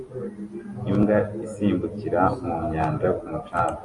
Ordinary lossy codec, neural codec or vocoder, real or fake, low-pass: AAC, 48 kbps; none; real; 10.8 kHz